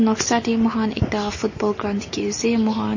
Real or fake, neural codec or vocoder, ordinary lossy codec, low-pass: real; none; MP3, 32 kbps; 7.2 kHz